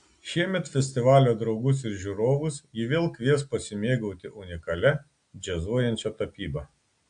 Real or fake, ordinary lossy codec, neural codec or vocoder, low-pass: real; AAC, 64 kbps; none; 9.9 kHz